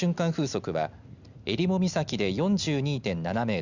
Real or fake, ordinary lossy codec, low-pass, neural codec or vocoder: real; Opus, 64 kbps; 7.2 kHz; none